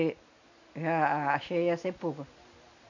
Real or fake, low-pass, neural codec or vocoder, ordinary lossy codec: real; 7.2 kHz; none; none